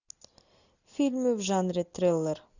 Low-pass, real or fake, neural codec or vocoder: 7.2 kHz; real; none